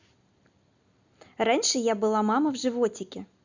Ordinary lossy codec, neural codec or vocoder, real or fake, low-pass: Opus, 64 kbps; none; real; 7.2 kHz